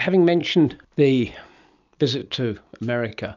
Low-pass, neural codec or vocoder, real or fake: 7.2 kHz; none; real